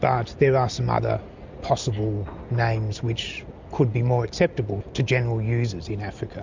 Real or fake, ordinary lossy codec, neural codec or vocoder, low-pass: real; MP3, 64 kbps; none; 7.2 kHz